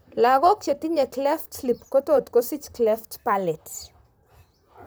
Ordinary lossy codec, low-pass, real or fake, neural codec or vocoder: none; none; fake; vocoder, 44.1 kHz, 128 mel bands, Pupu-Vocoder